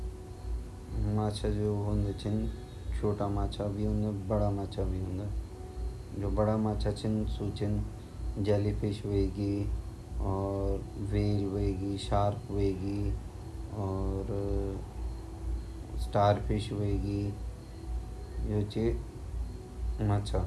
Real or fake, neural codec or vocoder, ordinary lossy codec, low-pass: real; none; none; none